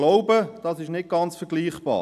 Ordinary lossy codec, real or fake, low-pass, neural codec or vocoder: none; real; 14.4 kHz; none